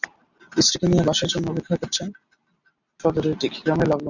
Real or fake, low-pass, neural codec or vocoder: real; 7.2 kHz; none